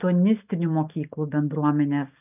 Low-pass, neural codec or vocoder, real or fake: 3.6 kHz; none; real